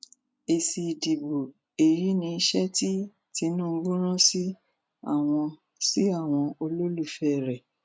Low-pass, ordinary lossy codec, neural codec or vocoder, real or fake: none; none; none; real